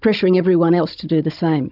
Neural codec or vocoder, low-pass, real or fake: codec, 16 kHz, 16 kbps, FunCodec, trained on Chinese and English, 50 frames a second; 5.4 kHz; fake